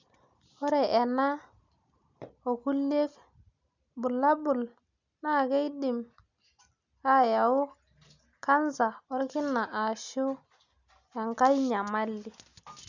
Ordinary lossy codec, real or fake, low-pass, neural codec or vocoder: none; real; 7.2 kHz; none